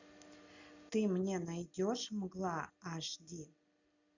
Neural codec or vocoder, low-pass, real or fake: none; 7.2 kHz; real